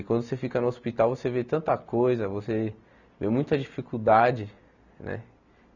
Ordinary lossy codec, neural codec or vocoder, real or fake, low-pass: none; none; real; 7.2 kHz